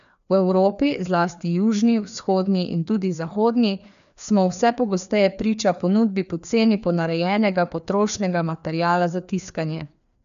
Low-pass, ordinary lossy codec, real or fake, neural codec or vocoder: 7.2 kHz; none; fake; codec, 16 kHz, 2 kbps, FreqCodec, larger model